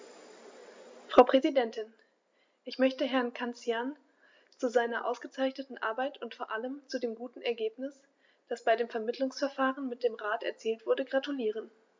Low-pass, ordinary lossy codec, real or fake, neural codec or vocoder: 7.2 kHz; MP3, 64 kbps; real; none